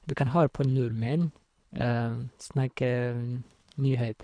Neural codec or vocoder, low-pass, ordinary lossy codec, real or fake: codec, 24 kHz, 3 kbps, HILCodec; 9.9 kHz; none; fake